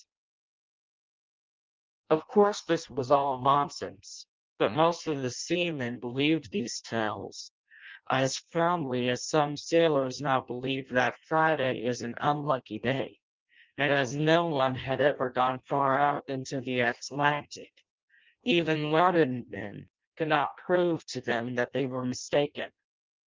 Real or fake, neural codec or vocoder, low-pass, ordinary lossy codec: fake; codec, 16 kHz in and 24 kHz out, 0.6 kbps, FireRedTTS-2 codec; 7.2 kHz; Opus, 24 kbps